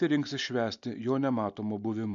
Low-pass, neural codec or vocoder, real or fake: 7.2 kHz; none; real